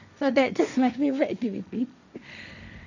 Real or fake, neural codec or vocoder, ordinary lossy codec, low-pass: fake; codec, 16 kHz, 1.1 kbps, Voila-Tokenizer; none; 7.2 kHz